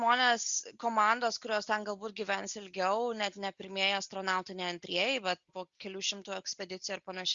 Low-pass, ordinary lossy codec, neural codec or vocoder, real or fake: 7.2 kHz; Opus, 64 kbps; none; real